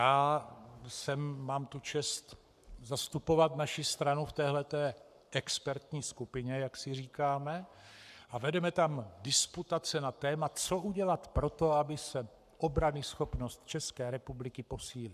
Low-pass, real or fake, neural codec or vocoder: 14.4 kHz; fake; codec, 44.1 kHz, 7.8 kbps, Pupu-Codec